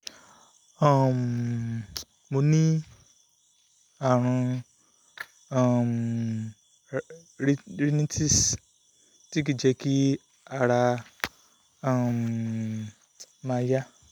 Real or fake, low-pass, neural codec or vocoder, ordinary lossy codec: real; 19.8 kHz; none; none